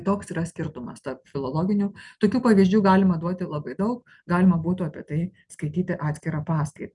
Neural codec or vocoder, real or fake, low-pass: none; real; 10.8 kHz